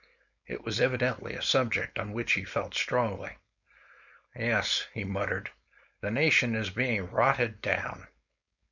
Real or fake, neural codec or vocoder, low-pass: fake; codec, 16 kHz, 4.8 kbps, FACodec; 7.2 kHz